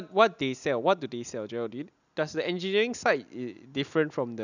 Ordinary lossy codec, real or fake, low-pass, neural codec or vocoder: none; real; 7.2 kHz; none